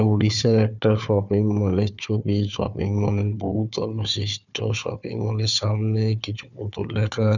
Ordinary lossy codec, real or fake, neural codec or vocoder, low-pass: none; fake; codec, 16 kHz, 4 kbps, FunCodec, trained on Chinese and English, 50 frames a second; 7.2 kHz